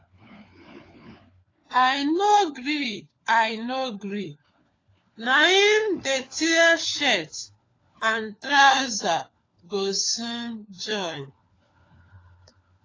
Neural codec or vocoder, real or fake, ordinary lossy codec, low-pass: codec, 16 kHz, 4 kbps, FunCodec, trained on LibriTTS, 50 frames a second; fake; AAC, 32 kbps; 7.2 kHz